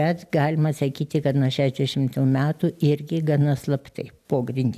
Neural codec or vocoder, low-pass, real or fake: none; 14.4 kHz; real